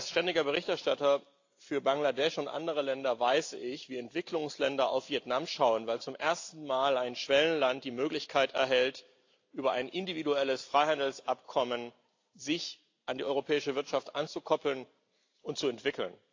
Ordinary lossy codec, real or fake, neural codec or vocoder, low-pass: AAC, 48 kbps; real; none; 7.2 kHz